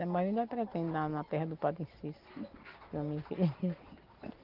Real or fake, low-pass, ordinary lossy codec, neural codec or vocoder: real; 5.4 kHz; Opus, 32 kbps; none